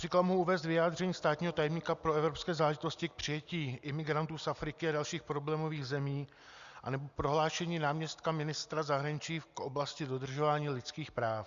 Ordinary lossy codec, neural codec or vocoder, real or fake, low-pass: Opus, 64 kbps; none; real; 7.2 kHz